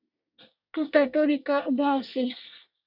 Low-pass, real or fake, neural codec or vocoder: 5.4 kHz; fake; codec, 24 kHz, 1 kbps, SNAC